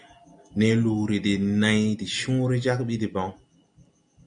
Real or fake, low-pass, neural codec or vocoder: real; 9.9 kHz; none